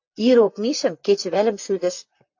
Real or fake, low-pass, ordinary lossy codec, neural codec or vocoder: fake; 7.2 kHz; AAC, 48 kbps; vocoder, 44.1 kHz, 128 mel bands, Pupu-Vocoder